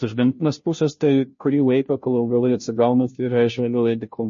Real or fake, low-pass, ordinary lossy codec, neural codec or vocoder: fake; 7.2 kHz; MP3, 32 kbps; codec, 16 kHz, 0.5 kbps, FunCodec, trained on Chinese and English, 25 frames a second